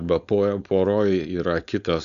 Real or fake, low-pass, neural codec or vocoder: fake; 7.2 kHz; codec, 16 kHz, 4.8 kbps, FACodec